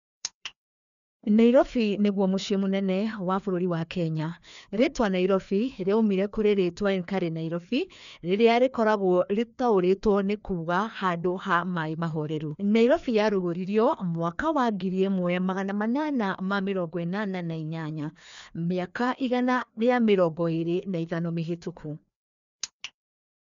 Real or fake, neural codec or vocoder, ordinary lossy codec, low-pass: fake; codec, 16 kHz, 2 kbps, FreqCodec, larger model; none; 7.2 kHz